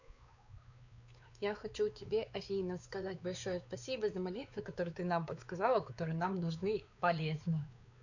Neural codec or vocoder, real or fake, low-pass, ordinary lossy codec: codec, 16 kHz, 4 kbps, X-Codec, WavLM features, trained on Multilingual LibriSpeech; fake; 7.2 kHz; none